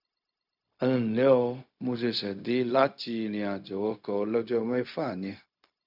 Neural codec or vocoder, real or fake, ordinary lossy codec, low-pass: codec, 16 kHz, 0.4 kbps, LongCat-Audio-Codec; fake; none; 5.4 kHz